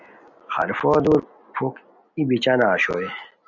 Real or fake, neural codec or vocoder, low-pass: real; none; 7.2 kHz